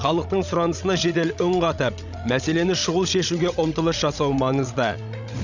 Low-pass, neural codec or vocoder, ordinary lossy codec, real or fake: 7.2 kHz; codec, 16 kHz, 16 kbps, FreqCodec, larger model; none; fake